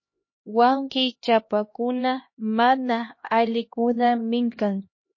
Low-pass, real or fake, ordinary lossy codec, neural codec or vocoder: 7.2 kHz; fake; MP3, 32 kbps; codec, 16 kHz, 1 kbps, X-Codec, HuBERT features, trained on LibriSpeech